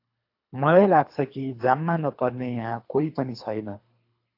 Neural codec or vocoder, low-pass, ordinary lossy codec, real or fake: codec, 24 kHz, 3 kbps, HILCodec; 5.4 kHz; AAC, 32 kbps; fake